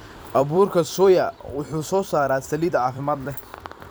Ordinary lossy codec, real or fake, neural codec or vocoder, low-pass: none; fake; vocoder, 44.1 kHz, 128 mel bands every 256 samples, BigVGAN v2; none